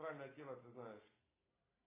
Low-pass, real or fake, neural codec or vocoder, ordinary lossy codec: 3.6 kHz; real; none; AAC, 16 kbps